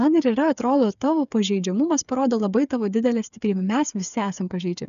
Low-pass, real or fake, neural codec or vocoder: 7.2 kHz; fake; codec, 16 kHz, 8 kbps, FreqCodec, smaller model